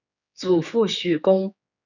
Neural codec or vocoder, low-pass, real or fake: codec, 16 kHz, 4 kbps, X-Codec, HuBERT features, trained on general audio; 7.2 kHz; fake